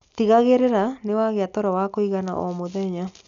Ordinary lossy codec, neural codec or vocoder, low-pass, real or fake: none; none; 7.2 kHz; real